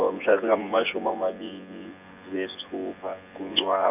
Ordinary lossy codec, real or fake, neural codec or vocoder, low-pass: none; fake; vocoder, 24 kHz, 100 mel bands, Vocos; 3.6 kHz